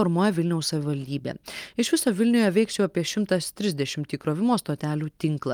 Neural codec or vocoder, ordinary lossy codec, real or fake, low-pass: none; Opus, 32 kbps; real; 19.8 kHz